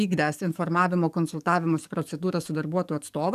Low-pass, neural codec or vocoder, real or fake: 14.4 kHz; codec, 44.1 kHz, 7.8 kbps, DAC; fake